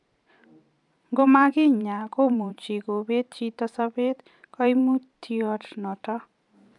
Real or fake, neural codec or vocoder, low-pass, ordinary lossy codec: fake; vocoder, 44.1 kHz, 128 mel bands every 512 samples, BigVGAN v2; 10.8 kHz; none